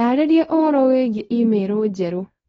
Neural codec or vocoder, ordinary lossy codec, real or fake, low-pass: codec, 24 kHz, 0.9 kbps, WavTokenizer, small release; AAC, 24 kbps; fake; 10.8 kHz